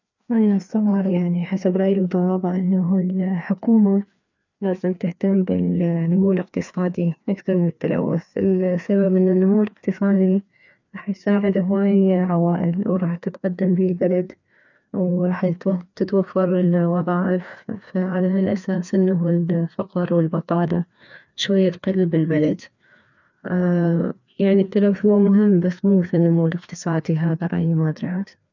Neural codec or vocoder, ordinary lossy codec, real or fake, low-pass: codec, 16 kHz, 2 kbps, FreqCodec, larger model; none; fake; 7.2 kHz